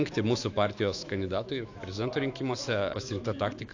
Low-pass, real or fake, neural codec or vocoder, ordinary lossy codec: 7.2 kHz; fake; autoencoder, 48 kHz, 128 numbers a frame, DAC-VAE, trained on Japanese speech; AAC, 48 kbps